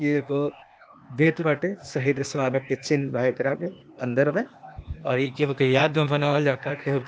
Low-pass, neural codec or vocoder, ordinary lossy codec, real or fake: none; codec, 16 kHz, 0.8 kbps, ZipCodec; none; fake